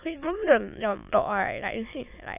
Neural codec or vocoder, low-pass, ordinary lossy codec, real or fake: autoencoder, 22.05 kHz, a latent of 192 numbers a frame, VITS, trained on many speakers; 3.6 kHz; none; fake